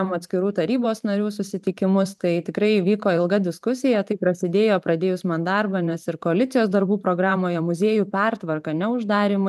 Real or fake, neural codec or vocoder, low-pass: fake; vocoder, 44.1 kHz, 128 mel bands every 512 samples, BigVGAN v2; 14.4 kHz